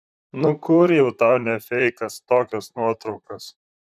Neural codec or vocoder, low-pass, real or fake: vocoder, 44.1 kHz, 128 mel bands, Pupu-Vocoder; 14.4 kHz; fake